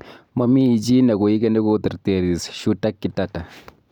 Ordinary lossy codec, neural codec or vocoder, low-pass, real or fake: none; none; 19.8 kHz; real